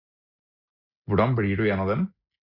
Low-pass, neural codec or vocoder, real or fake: 5.4 kHz; none; real